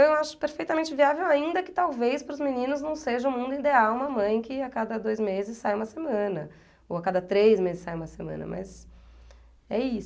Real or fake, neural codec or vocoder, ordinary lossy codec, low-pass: real; none; none; none